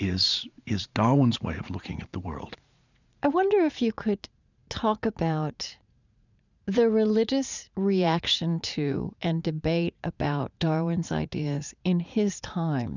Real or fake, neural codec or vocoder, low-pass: fake; vocoder, 44.1 kHz, 80 mel bands, Vocos; 7.2 kHz